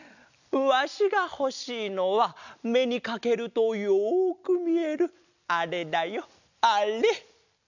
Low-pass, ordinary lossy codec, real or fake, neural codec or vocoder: 7.2 kHz; none; real; none